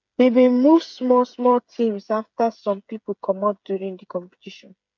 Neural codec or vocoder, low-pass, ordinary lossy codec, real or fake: codec, 16 kHz, 8 kbps, FreqCodec, smaller model; 7.2 kHz; none; fake